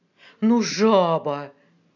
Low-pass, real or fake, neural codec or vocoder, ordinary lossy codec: 7.2 kHz; real; none; none